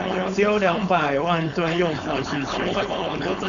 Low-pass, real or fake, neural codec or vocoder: 7.2 kHz; fake; codec, 16 kHz, 4.8 kbps, FACodec